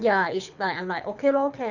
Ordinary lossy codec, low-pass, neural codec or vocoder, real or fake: none; 7.2 kHz; codec, 24 kHz, 6 kbps, HILCodec; fake